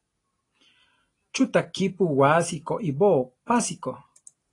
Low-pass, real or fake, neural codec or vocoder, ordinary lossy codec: 10.8 kHz; real; none; AAC, 32 kbps